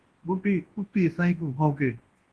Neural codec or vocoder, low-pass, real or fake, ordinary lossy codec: codec, 24 kHz, 0.9 kbps, WavTokenizer, large speech release; 10.8 kHz; fake; Opus, 16 kbps